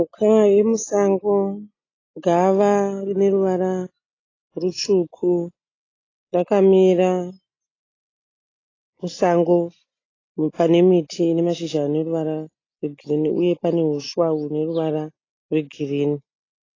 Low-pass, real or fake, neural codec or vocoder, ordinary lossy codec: 7.2 kHz; real; none; AAC, 32 kbps